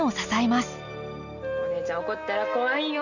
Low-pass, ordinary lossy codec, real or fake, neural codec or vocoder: 7.2 kHz; none; real; none